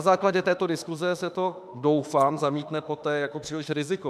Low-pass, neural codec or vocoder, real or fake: 14.4 kHz; autoencoder, 48 kHz, 32 numbers a frame, DAC-VAE, trained on Japanese speech; fake